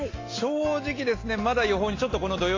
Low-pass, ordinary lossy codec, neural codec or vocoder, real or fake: 7.2 kHz; AAC, 32 kbps; none; real